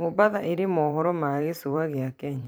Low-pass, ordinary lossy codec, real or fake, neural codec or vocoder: none; none; fake; vocoder, 44.1 kHz, 128 mel bands every 512 samples, BigVGAN v2